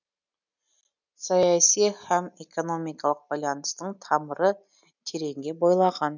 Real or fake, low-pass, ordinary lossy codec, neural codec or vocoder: real; 7.2 kHz; none; none